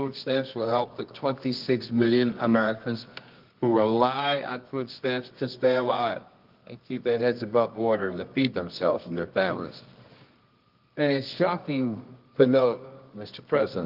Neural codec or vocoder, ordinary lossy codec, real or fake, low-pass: codec, 24 kHz, 0.9 kbps, WavTokenizer, medium music audio release; Opus, 32 kbps; fake; 5.4 kHz